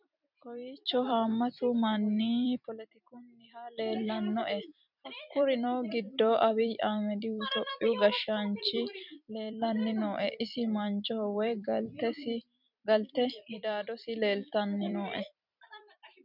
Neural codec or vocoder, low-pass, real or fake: vocoder, 44.1 kHz, 128 mel bands every 256 samples, BigVGAN v2; 5.4 kHz; fake